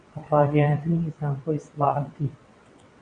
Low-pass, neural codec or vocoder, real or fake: 9.9 kHz; vocoder, 22.05 kHz, 80 mel bands, WaveNeXt; fake